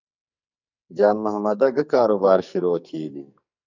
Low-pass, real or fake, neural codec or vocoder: 7.2 kHz; fake; codec, 44.1 kHz, 2.6 kbps, SNAC